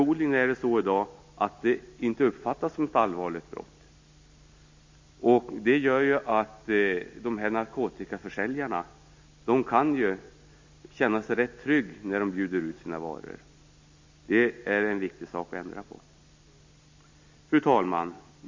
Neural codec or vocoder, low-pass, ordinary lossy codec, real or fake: none; 7.2 kHz; MP3, 48 kbps; real